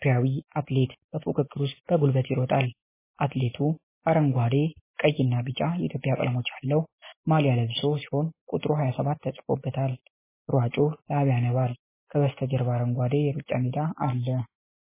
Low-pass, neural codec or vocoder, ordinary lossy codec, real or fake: 3.6 kHz; none; MP3, 16 kbps; real